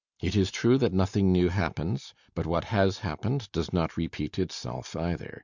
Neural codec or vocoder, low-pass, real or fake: none; 7.2 kHz; real